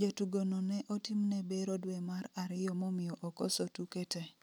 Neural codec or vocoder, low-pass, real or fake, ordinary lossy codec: none; none; real; none